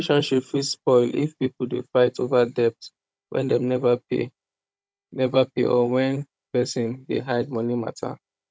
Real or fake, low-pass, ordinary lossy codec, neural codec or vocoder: fake; none; none; codec, 16 kHz, 16 kbps, FunCodec, trained on Chinese and English, 50 frames a second